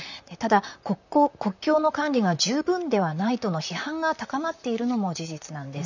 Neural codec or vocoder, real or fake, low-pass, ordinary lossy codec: vocoder, 44.1 kHz, 128 mel bands, Pupu-Vocoder; fake; 7.2 kHz; none